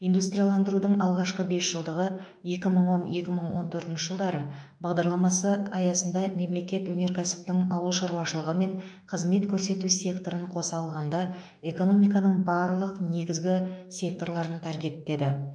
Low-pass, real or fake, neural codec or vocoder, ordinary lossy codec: 9.9 kHz; fake; autoencoder, 48 kHz, 32 numbers a frame, DAC-VAE, trained on Japanese speech; none